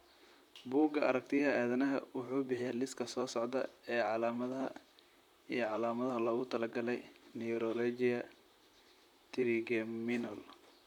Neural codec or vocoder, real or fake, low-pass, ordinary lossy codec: vocoder, 44.1 kHz, 128 mel bands, Pupu-Vocoder; fake; 19.8 kHz; none